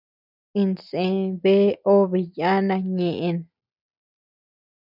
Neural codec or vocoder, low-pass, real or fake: none; 5.4 kHz; real